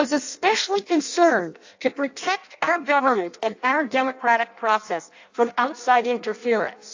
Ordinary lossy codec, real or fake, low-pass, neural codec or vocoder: AAC, 48 kbps; fake; 7.2 kHz; codec, 16 kHz in and 24 kHz out, 0.6 kbps, FireRedTTS-2 codec